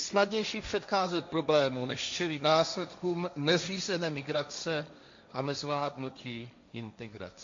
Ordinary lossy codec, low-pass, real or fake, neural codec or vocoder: MP3, 48 kbps; 7.2 kHz; fake; codec, 16 kHz, 1.1 kbps, Voila-Tokenizer